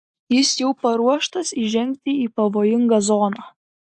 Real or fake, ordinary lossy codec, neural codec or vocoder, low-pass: real; AAC, 64 kbps; none; 10.8 kHz